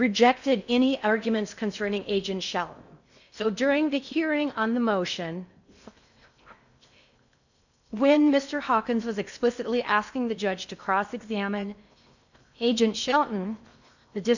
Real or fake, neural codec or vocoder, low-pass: fake; codec, 16 kHz in and 24 kHz out, 0.6 kbps, FocalCodec, streaming, 4096 codes; 7.2 kHz